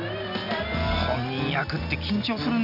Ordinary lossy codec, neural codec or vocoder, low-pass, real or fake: none; none; 5.4 kHz; real